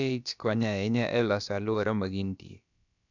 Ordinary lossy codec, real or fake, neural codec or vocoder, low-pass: none; fake; codec, 16 kHz, about 1 kbps, DyCAST, with the encoder's durations; 7.2 kHz